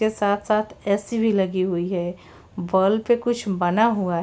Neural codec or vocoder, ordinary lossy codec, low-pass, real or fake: none; none; none; real